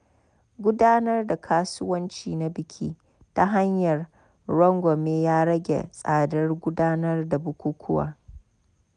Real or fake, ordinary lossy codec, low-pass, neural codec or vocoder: real; none; 9.9 kHz; none